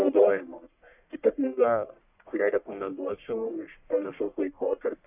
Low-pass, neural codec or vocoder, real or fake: 3.6 kHz; codec, 44.1 kHz, 1.7 kbps, Pupu-Codec; fake